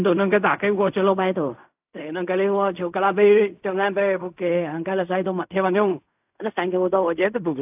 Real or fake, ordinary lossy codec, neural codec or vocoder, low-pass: fake; AAC, 32 kbps; codec, 16 kHz in and 24 kHz out, 0.4 kbps, LongCat-Audio-Codec, fine tuned four codebook decoder; 3.6 kHz